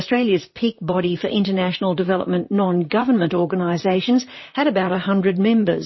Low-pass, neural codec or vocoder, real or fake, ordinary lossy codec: 7.2 kHz; vocoder, 44.1 kHz, 128 mel bands, Pupu-Vocoder; fake; MP3, 24 kbps